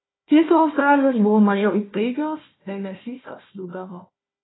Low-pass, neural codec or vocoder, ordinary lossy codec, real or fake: 7.2 kHz; codec, 16 kHz, 1 kbps, FunCodec, trained on Chinese and English, 50 frames a second; AAC, 16 kbps; fake